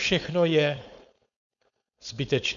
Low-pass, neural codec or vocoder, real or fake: 7.2 kHz; codec, 16 kHz, 4.8 kbps, FACodec; fake